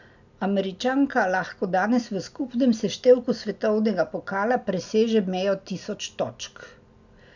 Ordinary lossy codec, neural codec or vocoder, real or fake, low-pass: none; none; real; 7.2 kHz